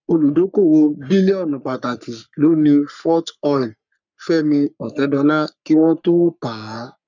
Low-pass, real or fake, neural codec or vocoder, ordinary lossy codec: 7.2 kHz; fake; codec, 44.1 kHz, 3.4 kbps, Pupu-Codec; none